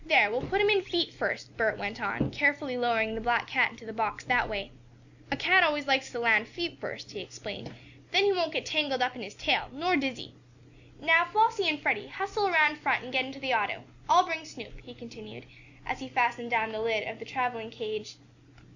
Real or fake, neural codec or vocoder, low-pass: real; none; 7.2 kHz